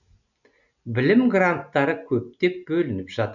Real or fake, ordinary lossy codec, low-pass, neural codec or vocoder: real; none; 7.2 kHz; none